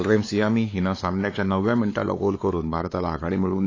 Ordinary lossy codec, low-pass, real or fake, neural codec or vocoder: AAC, 32 kbps; 7.2 kHz; fake; codec, 16 kHz, 4 kbps, X-Codec, WavLM features, trained on Multilingual LibriSpeech